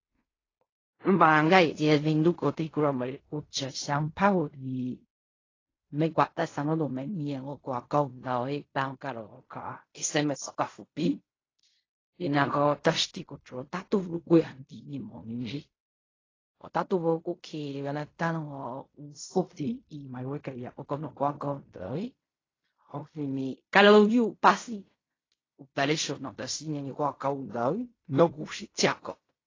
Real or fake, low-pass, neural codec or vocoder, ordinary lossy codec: fake; 7.2 kHz; codec, 16 kHz in and 24 kHz out, 0.4 kbps, LongCat-Audio-Codec, fine tuned four codebook decoder; AAC, 32 kbps